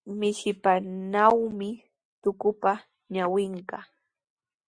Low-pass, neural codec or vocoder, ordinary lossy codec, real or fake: 9.9 kHz; none; Opus, 64 kbps; real